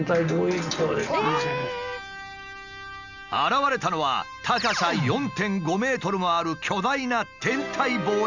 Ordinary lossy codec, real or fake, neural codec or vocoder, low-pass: none; real; none; 7.2 kHz